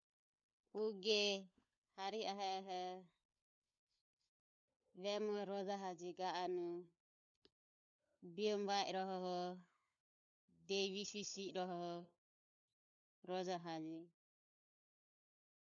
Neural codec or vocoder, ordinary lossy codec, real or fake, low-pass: codec, 16 kHz, 16 kbps, FunCodec, trained on LibriTTS, 50 frames a second; none; fake; 7.2 kHz